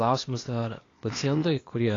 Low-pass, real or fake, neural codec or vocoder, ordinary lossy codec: 7.2 kHz; fake; codec, 16 kHz, 0.8 kbps, ZipCodec; AAC, 32 kbps